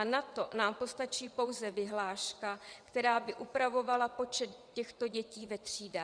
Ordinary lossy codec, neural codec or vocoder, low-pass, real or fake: Opus, 64 kbps; vocoder, 22.05 kHz, 80 mel bands, WaveNeXt; 9.9 kHz; fake